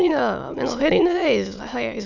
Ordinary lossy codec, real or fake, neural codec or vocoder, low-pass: none; fake; autoencoder, 22.05 kHz, a latent of 192 numbers a frame, VITS, trained on many speakers; 7.2 kHz